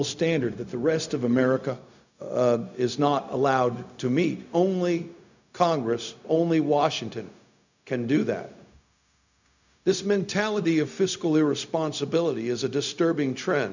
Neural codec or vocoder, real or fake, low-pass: codec, 16 kHz, 0.4 kbps, LongCat-Audio-Codec; fake; 7.2 kHz